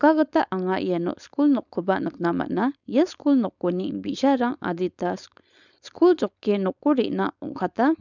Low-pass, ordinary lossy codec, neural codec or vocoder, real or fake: 7.2 kHz; none; codec, 16 kHz, 4.8 kbps, FACodec; fake